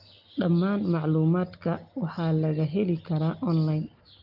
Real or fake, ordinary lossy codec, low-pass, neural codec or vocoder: real; Opus, 32 kbps; 5.4 kHz; none